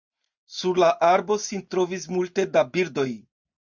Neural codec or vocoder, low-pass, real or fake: none; 7.2 kHz; real